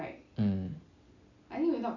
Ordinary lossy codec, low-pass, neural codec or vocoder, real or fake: none; 7.2 kHz; none; real